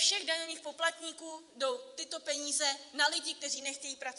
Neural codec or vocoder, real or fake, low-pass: vocoder, 24 kHz, 100 mel bands, Vocos; fake; 10.8 kHz